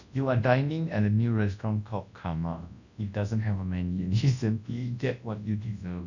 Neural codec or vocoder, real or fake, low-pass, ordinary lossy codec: codec, 24 kHz, 0.9 kbps, WavTokenizer, large speech release; fake; 7.2 kHz; none